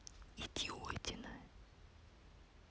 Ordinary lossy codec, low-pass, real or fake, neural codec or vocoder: none; none; real; none